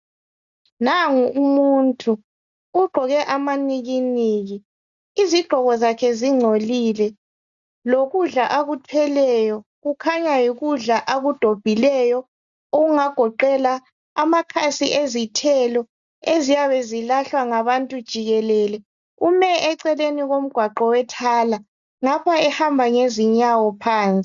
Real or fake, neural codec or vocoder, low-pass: real; none; 7.2 kHz